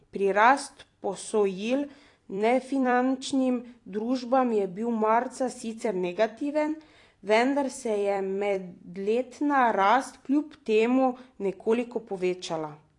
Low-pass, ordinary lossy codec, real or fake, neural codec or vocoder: 10.8 kHz; AAC, 48 kbps; fake; vocoder, 44.1 kHz, 128 mel bands every 256 samples, BigVGAN v2